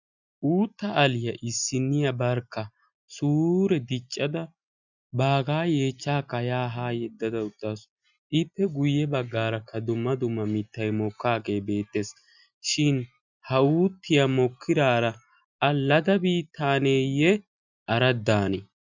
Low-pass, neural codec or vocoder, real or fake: 7.2 kHz; none; real